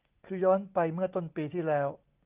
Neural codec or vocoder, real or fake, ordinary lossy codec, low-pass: none; real; Opus, 24 kbps; 3.6 kHz